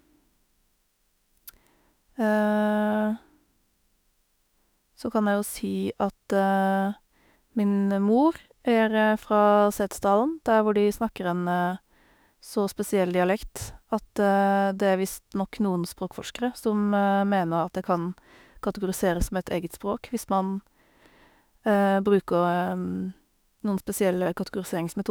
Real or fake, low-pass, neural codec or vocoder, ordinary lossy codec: fake; none; autoencoder, 48 kHz, 32 numbers a frame, DAC-VAE, trained on Japanese speech; none